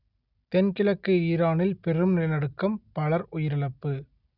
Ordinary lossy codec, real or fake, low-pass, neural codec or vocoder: none; real; 5.4 kHz; none